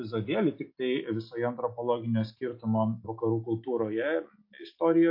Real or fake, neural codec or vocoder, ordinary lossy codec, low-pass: real; none; MP3, 32 kbps; 5.4 kHz